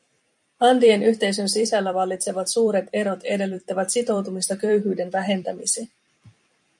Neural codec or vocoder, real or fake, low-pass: vocoder, 44.1 kHz, 128 mel bands every 256 samples, BigVGAN v2; fake; 10.8 kHz